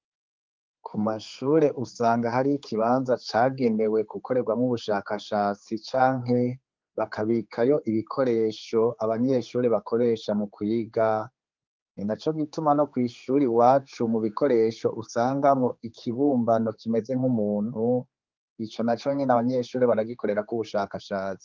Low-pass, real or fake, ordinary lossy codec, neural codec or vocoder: 7.2 kHz; fake; Opus, 24 kbps; codec, 16 kHz, 4 kbps, X-Codec, HuBERT features, trained on general audio